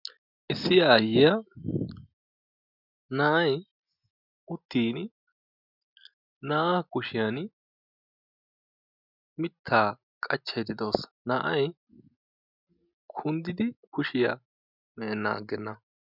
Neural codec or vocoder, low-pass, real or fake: none; 5.4 kHz; real